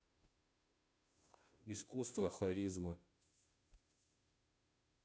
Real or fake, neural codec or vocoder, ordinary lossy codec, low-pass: fake; codec, 16 kHz, 0.5 kbps, FunCodec, trained on Chinese and English, 25 frames a second; none; none